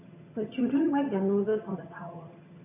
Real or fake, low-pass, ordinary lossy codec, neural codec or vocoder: fake; 3.6 kHz; none; vocoder, 22.05 kHz, 80 mel bands, HiFi-GAN